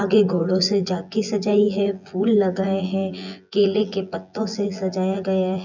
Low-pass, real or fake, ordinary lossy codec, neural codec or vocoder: 7.2 kHz; fake; none; vocoder, 24 kHz, 100 mel bands, Vocos